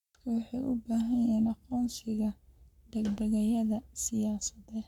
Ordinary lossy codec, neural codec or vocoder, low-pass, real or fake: none; codec, 44.1 kHz, 7.8 kbps, Pupu-Codec; 19.8 kHz; fake